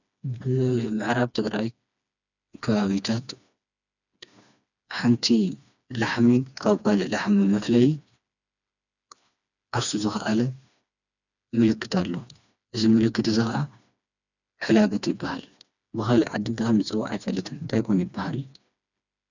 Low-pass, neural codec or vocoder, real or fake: 7.2 kHz; codec, 16 kHz, 2 kbps, FreqCodec, smaller model; fake